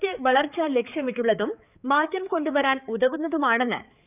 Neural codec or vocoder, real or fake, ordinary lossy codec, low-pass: codec, 16 kHz, 4 kbps, X-Codec, HuBERT features, trained on balanced general audio; fake; none; 3.6 kHz